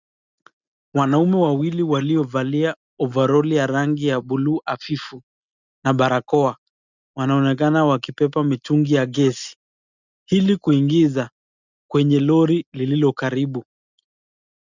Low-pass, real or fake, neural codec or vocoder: 7.2 kHz; real; none